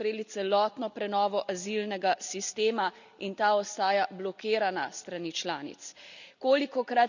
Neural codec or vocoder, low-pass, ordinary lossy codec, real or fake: none; 7.2 kHz; none; real